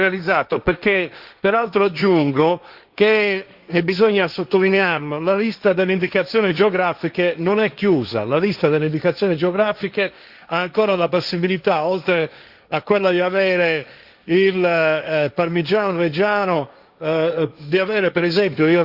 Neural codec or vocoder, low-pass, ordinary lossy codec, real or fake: codec, 16 kHz, 1.1 kbps, Voila-Tokenizer; 5.4 kHz; Opus, 64 kbps; fake